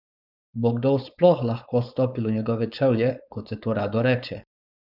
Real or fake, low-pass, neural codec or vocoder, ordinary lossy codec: fake; 5.4 kHz; codec, 16 kHz, 4.8 kbps, FACodec; none